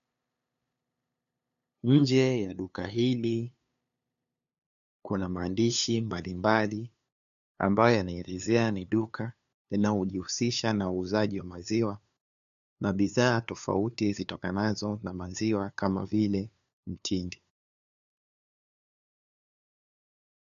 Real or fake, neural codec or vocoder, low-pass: fake; codec, 16 kHz, 2 kbps, FunCodec, trained on LibriTTS, 25 frames a second; 7.2 kHz